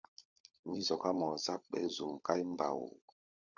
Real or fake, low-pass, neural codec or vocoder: fake; 7.2 kHz; codec, 16 kHz, 16 kbps, FunCodec, trained on LibriTTS, 50 frames a second